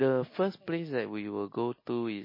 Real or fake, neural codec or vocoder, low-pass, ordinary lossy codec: real; none; 5.4 kHz; MP3, 24 kbps